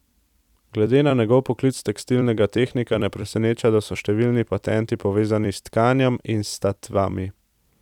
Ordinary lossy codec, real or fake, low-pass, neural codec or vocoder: none; fake; 19.8 kHz; vocoder, 44.1 kHz, 128 mel bands every 256 samples, BigVGAN v2